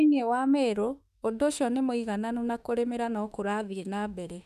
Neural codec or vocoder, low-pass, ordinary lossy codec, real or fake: autoencoder, 48 kHz, 32 numbers a frame, DAC-VAE, trained on Japanese speech; 14.4 kHz; none; fake